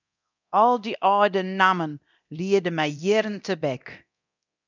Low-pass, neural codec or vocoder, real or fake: 7.2 kHz; codec, 24 kHz, 0.9 kbps, DualCodec; fake